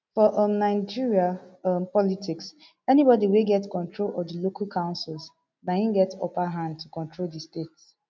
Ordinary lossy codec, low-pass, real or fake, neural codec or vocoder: none; none; real; none